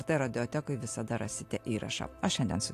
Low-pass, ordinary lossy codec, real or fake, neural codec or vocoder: 14.4 kHz; AAC, 64 kbps; real; none